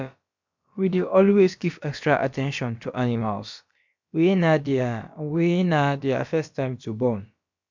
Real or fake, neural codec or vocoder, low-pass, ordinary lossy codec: fake; codec, 16 kHz, about 1 kbps, DyCAST, with the encoder's durations; 7.2 kHz; MP3, 64 kbps